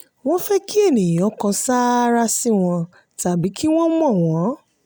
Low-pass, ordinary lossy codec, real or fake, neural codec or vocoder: none; none; real; none